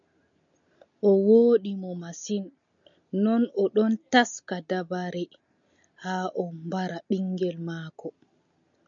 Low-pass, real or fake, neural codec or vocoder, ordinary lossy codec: 7.2 kHz; real; none; MP3, 96 kbps